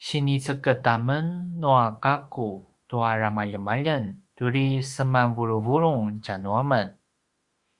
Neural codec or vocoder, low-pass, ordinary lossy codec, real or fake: autoencoder, 48 kHz, 32 numbers a frame, DAC-VAE, trained on Japanese speech; 10.8 kHz; Opus, 64 kbps; fake